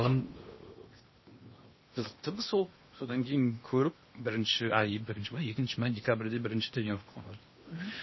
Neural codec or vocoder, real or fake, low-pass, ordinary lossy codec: codec, 16 kHz in and 24 kHz out, 0.8 kbps, FocalCodec, streaming, 65536 codes; fake; 7.2 kHz; MP3, 24 kbps